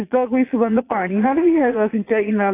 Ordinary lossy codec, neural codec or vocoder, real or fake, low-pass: AAC, 24 kbps; vocoder, 22.05 kHz, 80 mel bands, Vocos; fake; 3.6 kHz